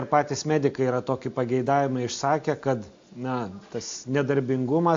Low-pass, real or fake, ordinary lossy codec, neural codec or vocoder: 7.2 kHz; real; AAC, 48 kbps; none